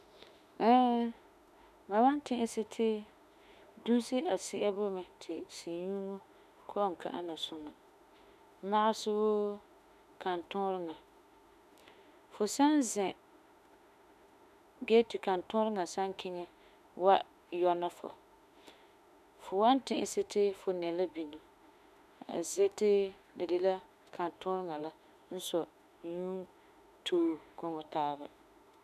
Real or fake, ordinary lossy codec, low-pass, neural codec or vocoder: fake; none; 14.4 kHz; autoencoder, 48 kHz, 32 numbers a frame, DAC-VAE, trained on Japanese speech